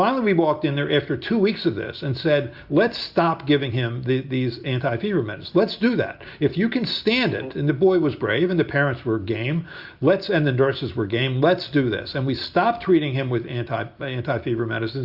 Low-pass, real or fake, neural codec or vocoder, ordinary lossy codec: 5.4 kHz; real; none; Opus, 64 kbps